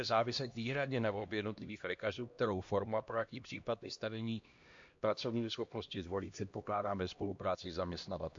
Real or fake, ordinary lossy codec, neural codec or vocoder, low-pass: fake; MP3, 48 kbps; codec, 16 kHz, 1 kbps, X-Codec, HuBERT features, trained on LibriSpeech; 7.2 kHz